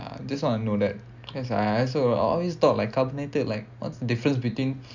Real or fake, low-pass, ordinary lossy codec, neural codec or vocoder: real; 7.2 kHz; none; none